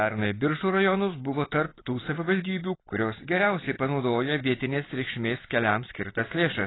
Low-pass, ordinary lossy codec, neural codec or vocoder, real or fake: 7.2 kHz; AAC, 16 kbps; none; real